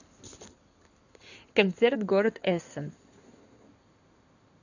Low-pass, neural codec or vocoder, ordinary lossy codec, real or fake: 7.2 kHz; codec, 16 kHz in and 24 kHz out, 2.2 kbps, FireRedTTS-2 codec; none; fake